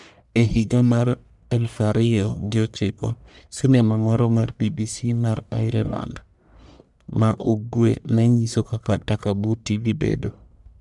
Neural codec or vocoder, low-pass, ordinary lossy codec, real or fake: codec, 44.1 kHz, 1.7 kbps, Pupu-Codec; 10.8 kHz; none; fake